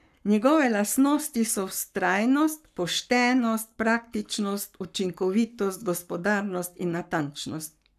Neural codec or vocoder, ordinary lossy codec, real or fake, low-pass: codec, 44.1 kHz, 7.8 kbps, Pupu-Codec; none; fake; 14.4 kHz